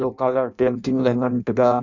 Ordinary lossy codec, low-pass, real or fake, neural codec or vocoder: none; 7.2 kHz; fake; codec, 16 kHz in and 24 kHz out, 0.6 kbps, FireRedTTS-2 codec